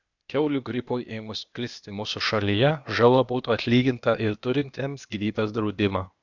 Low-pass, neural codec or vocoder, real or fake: 7.2 kHz; codec, 16 kHz, 0.8 kbps, ZipCodec; fake